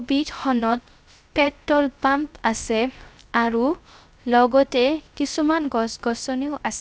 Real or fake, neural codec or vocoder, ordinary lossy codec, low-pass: fake; codec, 16 kHz, 0.3 kbps, FocalCodec; none; none